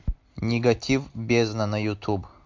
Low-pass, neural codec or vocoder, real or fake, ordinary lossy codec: 7.2 kHz; none; real; MP3, 64 kbps